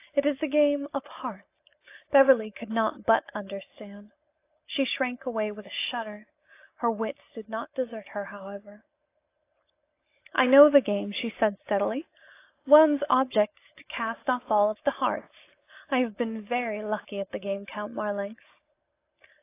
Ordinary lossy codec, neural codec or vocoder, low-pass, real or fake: AAC, 24 kbps; none; 3.6 kHz; real